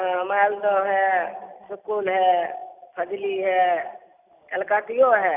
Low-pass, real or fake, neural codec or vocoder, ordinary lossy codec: 3.6 kHz; real; none; Opus, 64 kbps